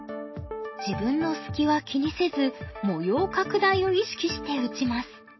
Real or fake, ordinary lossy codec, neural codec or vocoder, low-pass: real; MP3, 24 kbps; none; 7.2 kHz